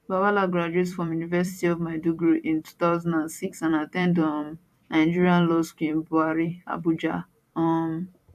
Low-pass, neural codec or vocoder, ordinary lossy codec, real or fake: 14.4 kHz; vocoder, 44.1 kHz, 128 mel bands every 256 samples, BigVGAN v2; AAC, 96 kbps; fake